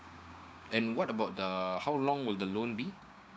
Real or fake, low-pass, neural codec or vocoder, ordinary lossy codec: fake; none; codec, 16 kHz, 6 kbps, DAC; none